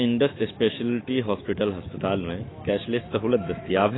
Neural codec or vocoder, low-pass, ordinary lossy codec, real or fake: autoencoder, 48 kHz, 128 numbers a frame, DAC-VAE, trained on Japanese speech; 7.2 kHz; AAC, 16 kbps; fake